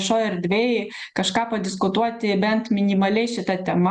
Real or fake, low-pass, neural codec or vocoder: real; 10.8 kHz; none